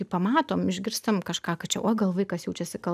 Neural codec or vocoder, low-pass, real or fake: none; 14.4 kHz; real